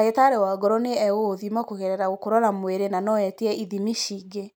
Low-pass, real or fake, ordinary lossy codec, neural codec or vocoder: none; real; none; none